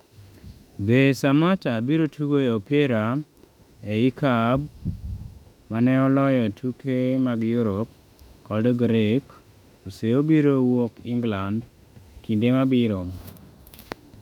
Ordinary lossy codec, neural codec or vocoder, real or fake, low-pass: none; autoencoder, 48 kHz, 32 numbers a frame, DAC-VAE, trained on Japanese speech; fake; 19.8 kHz